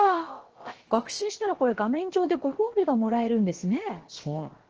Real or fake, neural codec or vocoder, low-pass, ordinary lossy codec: fake; codec, 16 kHz, about 1 kbps, DyCAST, with the encoder's durations; 7.2 kHz; Opus, 16 kbps